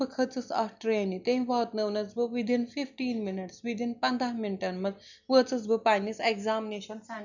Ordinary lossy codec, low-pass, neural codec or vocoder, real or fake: none; 7.2 kHz; none; real